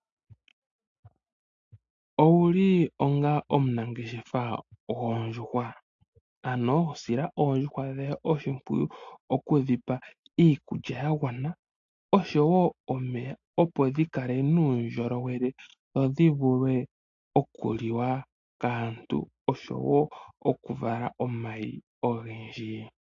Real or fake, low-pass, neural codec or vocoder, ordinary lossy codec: real; 7.2 kHz; none; AAC, 48 kbps